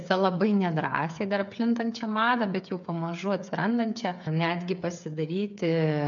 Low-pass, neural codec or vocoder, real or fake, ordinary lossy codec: 7.2 kHz; codec, 16 kHz, 8 kbps, FreqCodec, smaller model; fake; MP3, 64 kbps